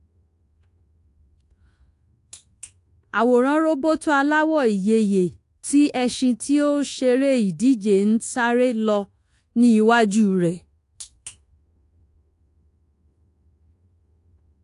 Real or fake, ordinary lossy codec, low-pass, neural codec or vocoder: fake; AAC, 48 kbps; 10.8 kHz; codec, 24 kHz, 1.2 kbps, DualCodec